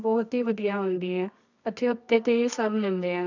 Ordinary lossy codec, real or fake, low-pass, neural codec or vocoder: none; fake; 7.2 kHz; codec, 24 kHz, 0.9 kbps, WavTokenizer, medium music audio release